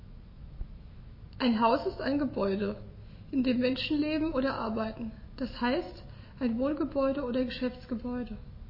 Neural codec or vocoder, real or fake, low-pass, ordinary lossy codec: autoencoder, 48 kHz, 128 numbers a frame, DAC-VAE, trained on Japanese speech; fake; 5.4 kHz; MP3, 24 kbps